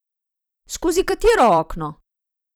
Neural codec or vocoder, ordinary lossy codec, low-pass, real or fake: vocoder, 44.1 kHz, 128 mel bands every 256 samples, BigVGAN v2; none; none; fake